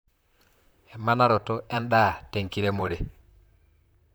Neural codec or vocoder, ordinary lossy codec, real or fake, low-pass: vocoder, 44.1 kHz, 128 mel bands, Pupu-Vocoder; none; fake; none